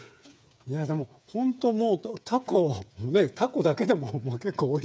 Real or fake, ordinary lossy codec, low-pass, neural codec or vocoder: fake; none; none; codec, 16 kHz, 8 kbps, FreqCodec, smaller model